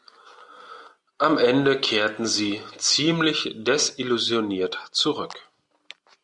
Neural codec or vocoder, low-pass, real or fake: none; 10.8 kHz; real